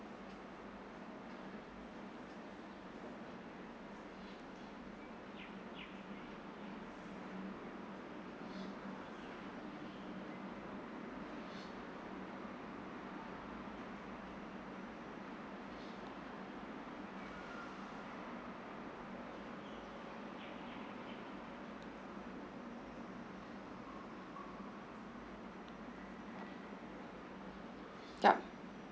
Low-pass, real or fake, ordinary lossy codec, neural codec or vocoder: none; real; none; none